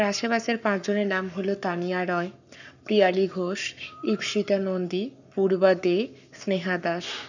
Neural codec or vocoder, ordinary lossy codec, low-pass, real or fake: codec, 44.1 kHz, 7.8 kbps, Pupu-Codec; none; 7.2 kHz; fake